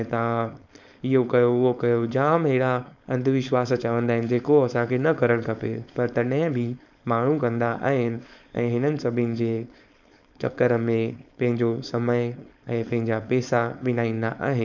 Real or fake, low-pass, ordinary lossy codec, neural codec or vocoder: fake; 7.2 kHz; none; codec, 16 kHz, 4.8 kbps, FACodec